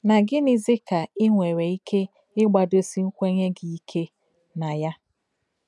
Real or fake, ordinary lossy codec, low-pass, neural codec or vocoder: real; none; none; none